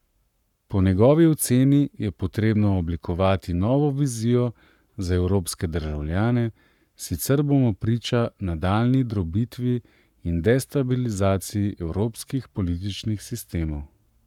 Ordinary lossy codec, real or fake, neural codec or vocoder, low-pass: none; fake; codec, 44.1 kHz, 7.8 kbps, Pupu-Codec; 19.8 kHz